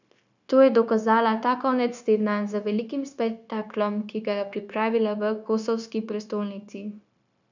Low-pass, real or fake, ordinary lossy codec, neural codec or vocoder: 7.2 kHz; fake; none; codec, 16 kHz, 0.9 kbps, LongCat-Audio-Codec